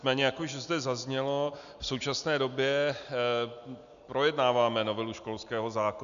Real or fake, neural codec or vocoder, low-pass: real; none; 7.2 kHz